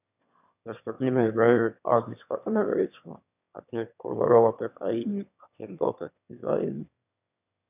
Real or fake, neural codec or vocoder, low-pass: fake; autoencoder, 22.05 kHz, a latent of 192 numbers a frame, VITS, trained on one speaker; 3.6 kHz